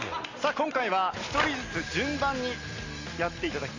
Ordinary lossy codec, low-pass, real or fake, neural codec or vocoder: AAC, 32 kbps; 7.2 kHz; real; none